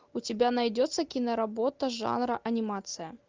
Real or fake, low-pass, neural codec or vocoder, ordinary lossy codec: real; 7.2 kHz; none; Opus, 24 kbps